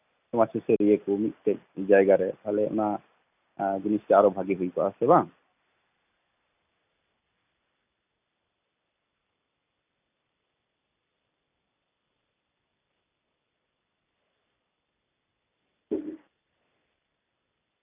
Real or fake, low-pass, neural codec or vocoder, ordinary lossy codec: real; 3.6 kHz; none; none